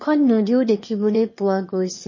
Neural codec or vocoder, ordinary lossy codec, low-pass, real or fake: autoencoder, 22.05 kHz, a latent of 192 numbers a frame, VITS, trained on one speaker; MP3, 32 kbps; 7.2 kHz; fake